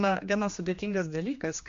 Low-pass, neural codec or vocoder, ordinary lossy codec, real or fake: 7.2 kHz; codec, 16 kHz, 2 kbps, X-Codec, HuBERT features, trained on general audio; MP3, 48 kbps; fake